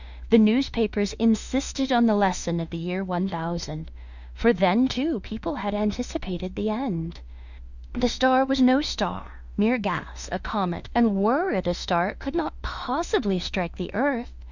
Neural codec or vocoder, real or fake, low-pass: autoencoder, 48 kHz, 32 numbers a frame, DAC-VAE, trained on Japanese speech; fake; 7.2 kHz